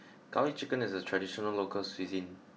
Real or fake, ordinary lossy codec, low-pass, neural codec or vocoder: real; none; none; none